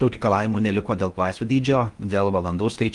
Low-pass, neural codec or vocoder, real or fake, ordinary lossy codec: 10.8 kHz; codec, 16 kHz in and 24 kHz out, 0.6 kbps, FocalCodec, streaming, 4096 codes; fake; Opus, 32 kbps